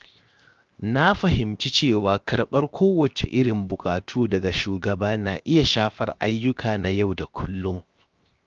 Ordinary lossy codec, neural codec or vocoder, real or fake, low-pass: Opus, 24 kbps; codec, 16 kHz, 0.7 kbps, FocalCodec; fake; 7.2 kHz